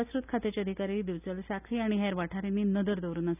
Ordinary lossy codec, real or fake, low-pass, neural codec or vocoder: none; real; 3.6 kHz; none